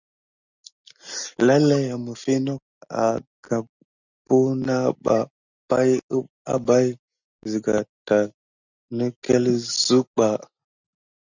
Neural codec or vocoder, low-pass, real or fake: none; 7.2 kHz; real